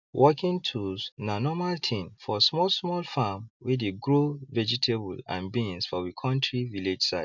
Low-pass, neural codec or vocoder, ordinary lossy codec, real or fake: 7.2 kHz; none; none; real